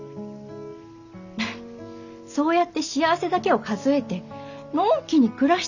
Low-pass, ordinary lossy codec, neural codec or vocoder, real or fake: 7.2 kHz; none; none; real